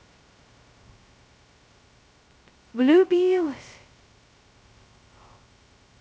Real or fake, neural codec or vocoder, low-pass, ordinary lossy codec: fake; codec, 16 kHz, 0.2 kbps, FocalCodec; none; none